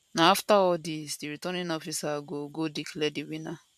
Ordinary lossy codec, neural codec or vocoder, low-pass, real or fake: none; vocoder, 44.1 kHz, 128 mel bands every 512 samples, BigVGAN v2; 14.4 kHz; fake